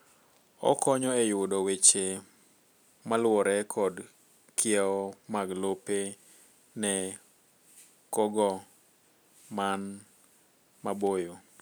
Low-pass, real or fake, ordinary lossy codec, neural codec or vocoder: none; real; none; none